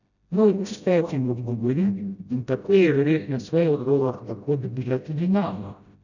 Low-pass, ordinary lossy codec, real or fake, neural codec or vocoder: 7.2 kHz; none; fake; codec, 16 kHz, 0.5 kbps, FreqCodec, smaller model